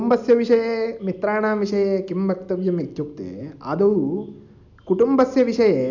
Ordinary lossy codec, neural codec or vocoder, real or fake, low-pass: none; none; real; 7.2 kHz